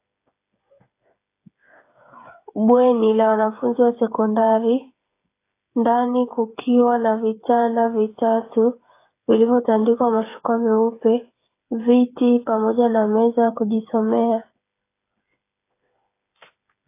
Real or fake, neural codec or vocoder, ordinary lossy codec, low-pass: fake; codec, 16 kHz, 8 kbps, FreqCodec, smaller model; AAC, 24 kbps; 3.6 kHz